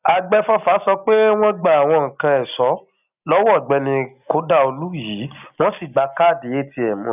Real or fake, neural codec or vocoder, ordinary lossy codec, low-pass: real; none; none; 3.6 kHz